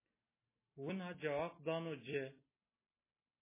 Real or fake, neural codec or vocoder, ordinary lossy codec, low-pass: real; none; MP3, 16 kbps; 3.6 kHz